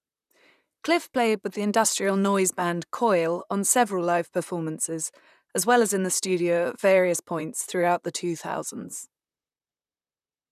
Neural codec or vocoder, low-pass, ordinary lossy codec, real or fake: vocoder, 44.1 kHz, 128 mel bands, Pupu-Vocoder; 14.4 kHz; none; fake